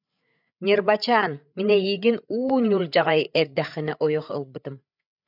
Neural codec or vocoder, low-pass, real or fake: codec, 16 kHz, 8 kbps, FreqCodec, larger model; 5.4 kHz; fake